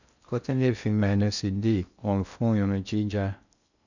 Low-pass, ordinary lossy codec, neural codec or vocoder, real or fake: 7.2 kHz; none; codec, 16 kHz in and 24 kHz out, 0.6 kbps, FocalCodec, streaming, 2048 codes; fake